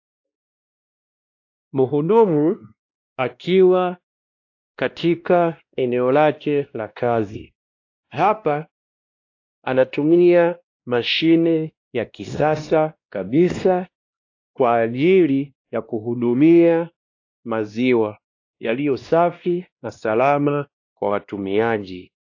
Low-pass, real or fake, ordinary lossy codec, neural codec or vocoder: 7.2 kHz; fake; AAC, 48 kbps; codec, 16 kHz, 1 kbps, X-Codec, WavLM features, trained on Multilingual LibriSpeech